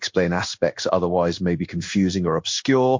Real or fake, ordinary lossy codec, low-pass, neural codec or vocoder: fake; MP3, 48 kbps; 7.2 kHz; codec, 16 kHz in and 24 kHz out, 1 kbps, XY-Tokenizer